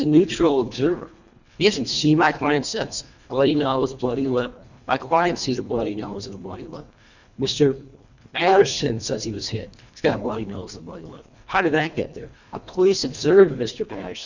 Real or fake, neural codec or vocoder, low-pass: fake; codec, 24 kHz, 1.5 kbps, HILCodec; 7.2 kHz